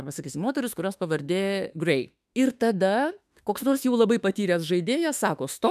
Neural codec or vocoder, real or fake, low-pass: autoencoder, 48 kHz, 32 numbers a frame, DAC-VAE, trained on Japanese speech; fake; 14.4 kHz